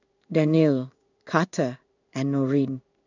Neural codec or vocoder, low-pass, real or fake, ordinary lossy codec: codec, 16 kHz in and 24 kHz out, 1 kbps, XY-Tokenizer; 7.2 kHz; fake; none